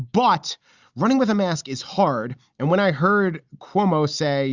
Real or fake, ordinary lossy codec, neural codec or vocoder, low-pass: real; Opus, 64 kbps; none; 7.2 kHz